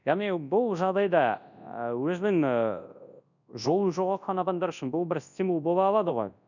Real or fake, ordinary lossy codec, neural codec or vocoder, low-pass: fake; none; codec, 24 kHz, 0.9 kbps, WavTokenizer, large speech release; 7.2 kHz